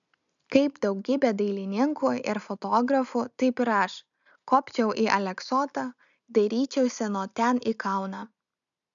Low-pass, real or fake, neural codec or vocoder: 7.2 kHz; real; none